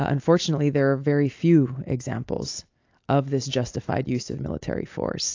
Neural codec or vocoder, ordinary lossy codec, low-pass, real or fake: none; AAC, 48 kbps; 7.2 kHz; real